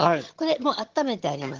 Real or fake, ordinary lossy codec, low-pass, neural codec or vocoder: fake; Opus, 16 kbps; 7.2 kHz; vocoder, 22.05 kHz, 80 mel bands, HiFi-GAN